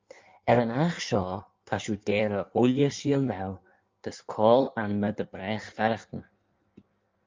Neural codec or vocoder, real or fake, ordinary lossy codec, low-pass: codec, 16 kHz in and 24 kHz out, 1.1 kbps, FireRedTTS-2 codec; fake; Opus, 24 kbps; 7.2 kHz